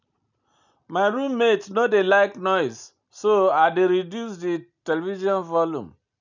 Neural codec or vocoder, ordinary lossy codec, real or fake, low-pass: none; none; real; 7.2 kHz